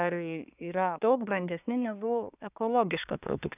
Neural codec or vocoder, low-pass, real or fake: codec, 24 kHz, 1 kbps, SNAC; 3.6 kHz; fake